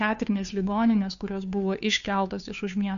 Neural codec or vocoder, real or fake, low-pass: codec, 16 kHz, 2 kbps, FunCodec, trained on Chinese and English, 25 frames a second; fake; 7.2 kHz